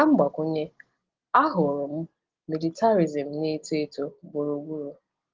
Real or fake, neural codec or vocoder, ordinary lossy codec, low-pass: real; none; Opus, 16 kbps; 7.2 kHz